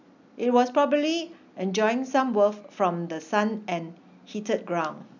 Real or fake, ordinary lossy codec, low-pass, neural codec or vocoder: real; none; 7.2 kHz; none